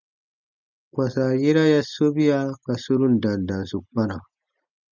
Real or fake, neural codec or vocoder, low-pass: real; none; 7.2 kHz